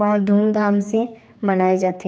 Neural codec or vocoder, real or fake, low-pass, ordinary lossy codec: codec, 16 kHz, 2 kbps, X-Codec, HuBERT features, trained on general audio; fake; none; none